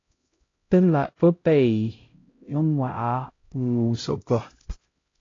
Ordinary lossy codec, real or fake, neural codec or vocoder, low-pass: AAC, 32 kbps; fake; codec, 16 kHz, 0.5 kbps, X-Codec, HuBERT features, trained on LibriSpeech; 7.2 kHz